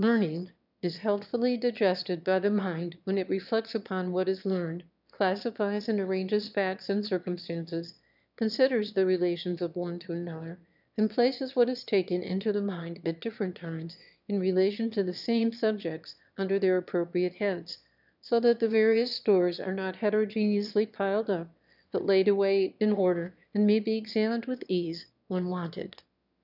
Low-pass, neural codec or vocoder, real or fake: 5.4 kHz; autoencoder, 22.05 kHz, a latent of 192 numbers a frame, VITS, trained on one speaker; fake